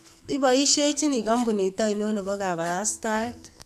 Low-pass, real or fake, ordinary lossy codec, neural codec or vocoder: 14.4 kHz; fake; none; codec, 44.1 kHz, 2.6 kbps, SNAC